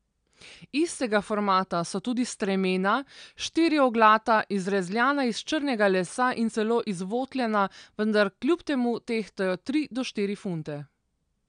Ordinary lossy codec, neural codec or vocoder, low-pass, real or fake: none; none; 9.9 kHz; real